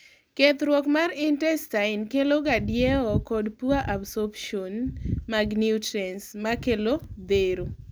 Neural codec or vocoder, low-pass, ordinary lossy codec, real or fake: none; none; none; real